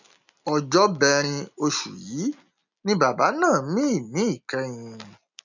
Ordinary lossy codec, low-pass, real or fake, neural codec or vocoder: none; 7.2 kHz; real; none